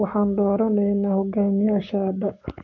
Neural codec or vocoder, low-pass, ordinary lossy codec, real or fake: codec, 44.1 kHz, 7.8 kbps, Pupu-Codec; 7.2 kHz; none; fake